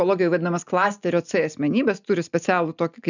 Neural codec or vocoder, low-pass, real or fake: none; 7.2 kHz; real